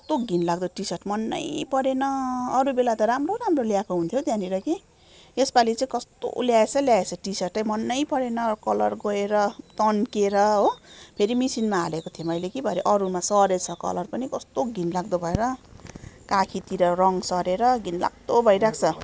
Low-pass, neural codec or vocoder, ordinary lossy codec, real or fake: none; none; none; real